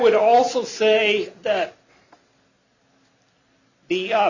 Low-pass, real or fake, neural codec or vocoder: 7.2 kHz; real; none